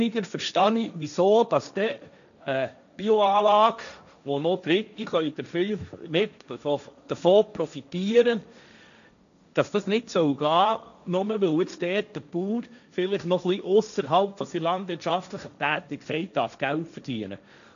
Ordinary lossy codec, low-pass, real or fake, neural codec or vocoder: none; 7.2 kHz; fake; codec, 16 kHz, 1.1 kbps, Voila-Tokenizer